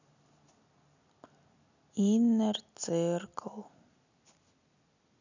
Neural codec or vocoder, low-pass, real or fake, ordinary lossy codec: none; 7.2 kHz; real; none